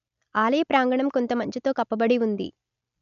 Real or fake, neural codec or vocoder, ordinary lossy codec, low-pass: real; none; none; 7.2 kHz